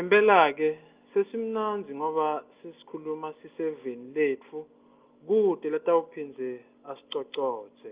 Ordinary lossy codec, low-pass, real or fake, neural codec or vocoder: Opus, 24 kbps; 3.6 kHz; real; none